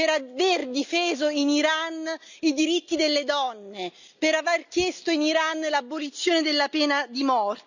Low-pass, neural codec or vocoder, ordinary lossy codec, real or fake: 7.2 kHz; none; none; real